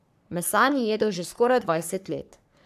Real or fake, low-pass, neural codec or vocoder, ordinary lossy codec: fake; 14.4 kHz; codec, 44.1 kHz, 3.4 kbps, Pupu-Codec; none